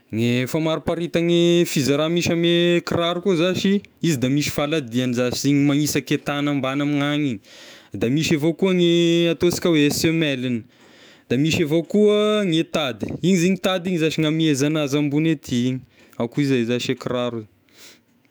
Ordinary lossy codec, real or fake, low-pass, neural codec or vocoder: none; fake; none; autoencoder, 48 kHz, 128 numbers a frame, DAC-VAE, trained on Japanese speech